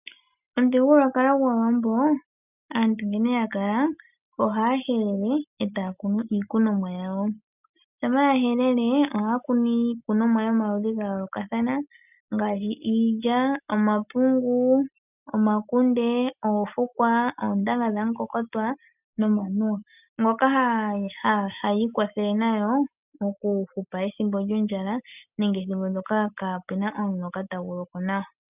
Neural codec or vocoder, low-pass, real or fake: none; 3.6 kHz; real